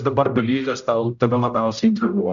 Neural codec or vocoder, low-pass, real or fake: codec, 16 kHz, 0.5 kbps, X-Codec, HuBERT features, trained on general audio; 7.2 kHz; fake